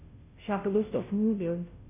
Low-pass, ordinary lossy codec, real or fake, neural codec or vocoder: 3.6 kHz; MP3, 24 kbps; fake; codec, 16 kHz, 0.5 kbps, FunCodec, trained on Chinese and English, 25 frames a second